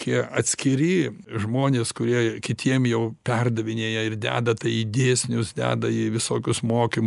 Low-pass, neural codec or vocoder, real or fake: 10.8 kHz; none; real